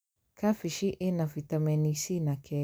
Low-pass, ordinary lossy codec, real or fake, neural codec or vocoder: none; none; real; none